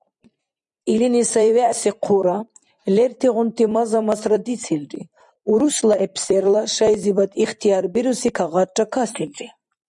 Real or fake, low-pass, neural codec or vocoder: fake; 10.8 kHz; vocoder, 44.1 kHz, 128 mel bands every 256 samples, BigVGAN v2